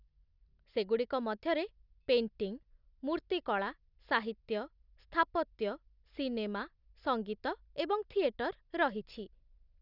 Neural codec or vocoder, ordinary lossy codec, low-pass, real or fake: none; none; 5.4 kHz; real